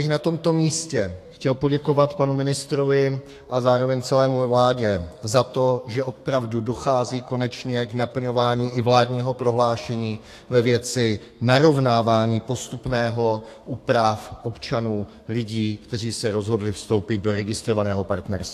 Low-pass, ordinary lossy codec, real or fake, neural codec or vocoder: 14.4 kHz; AAC, 64 kbps; fake; codec, 32 kHz, 1.9 kbps, SNAC